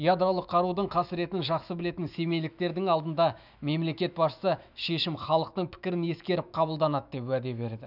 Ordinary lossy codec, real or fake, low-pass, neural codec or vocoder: none; fake; 5.4 kHz; autoencoder, 48 kHz, 128 numbers a frame, DAC-VAE, trained on Japanese speech